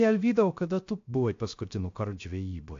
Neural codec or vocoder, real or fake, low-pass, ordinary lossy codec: codec, 16 kHz, 0.3 kbps, FocalCodec; fake; 7.2 kHz; AAC, 48 kbps